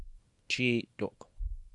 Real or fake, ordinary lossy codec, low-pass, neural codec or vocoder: fake; Opus, 64 kbps; 10.8 kHz; codec, 24 kHz, 0.9 kbps, WavTokenizer, small release